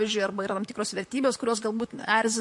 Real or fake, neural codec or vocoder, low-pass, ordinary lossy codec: fake; vocoder, 44.1 kHz, 128 mel bands, Pupu-Vocoder; 10.8 kHz; MP3, 48 kbps